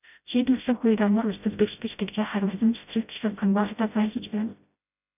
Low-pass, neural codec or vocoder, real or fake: 3.6 kHz; codec, 16 kHz, 0.5 kbps, FreqCodec, smaller model; fake